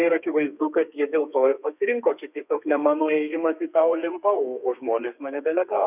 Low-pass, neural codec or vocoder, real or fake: 3.6 kHz; codec, 32 kHz, 1.9 kbps, SNAC; fake